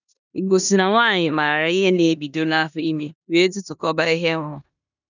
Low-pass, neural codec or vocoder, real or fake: 7.2 kHz; codec, 16 kHz in and 24 kHz out, 0.9 kbps, LongCat-Audio-Codec, four codebook decoder; fake